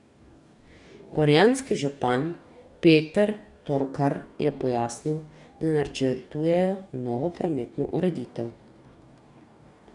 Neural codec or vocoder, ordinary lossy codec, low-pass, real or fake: codec, 44.1 kHz, 2.6 kbps, DAC; none; 10.8 kHz; fake